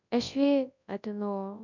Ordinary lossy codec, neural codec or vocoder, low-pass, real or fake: none; codec, 24 kHz, 0.9 kbps, WavTokenizer, large speech release; 7.2 kHz; fake